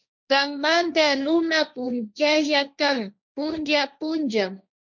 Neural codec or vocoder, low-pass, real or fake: codec, 16 kHz, 1.1 kbps, Voila-Tokenizer; 7.2 kHz; fake